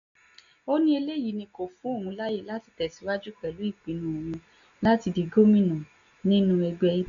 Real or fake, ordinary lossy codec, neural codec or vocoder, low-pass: real; none; none; 7.2 kHz